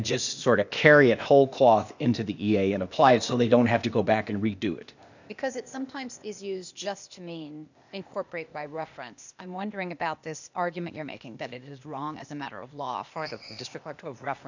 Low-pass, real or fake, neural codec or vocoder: 7.2 kHz; fake; codec, 16 kHz, 0.8 kbps, ZipCodec